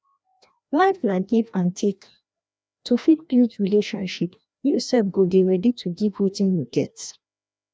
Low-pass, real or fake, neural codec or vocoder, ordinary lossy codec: none; fake; codec, 16 kHz, 1 kbps, FreqCodec, larger model; none